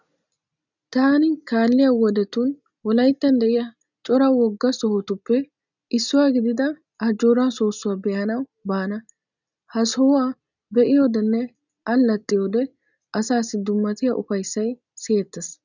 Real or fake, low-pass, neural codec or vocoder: real; 7.2 kHz; none